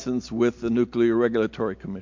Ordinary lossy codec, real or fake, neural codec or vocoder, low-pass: MP3, 48 kbps; real; none; 7.2 kHz